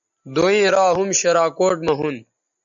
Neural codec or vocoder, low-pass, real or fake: none; 7.2 kHz; real